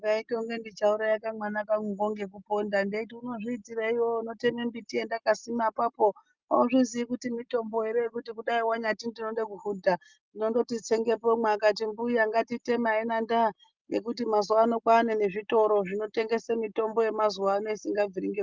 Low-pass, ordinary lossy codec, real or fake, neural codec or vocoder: 7.2 kHz; Opus, 32 kbps; real; none